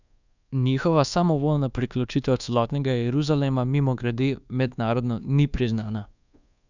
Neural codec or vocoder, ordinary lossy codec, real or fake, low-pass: codec, 24 kHz, 1.2 kbps, DualCodec; none; fake; 7.2 kHz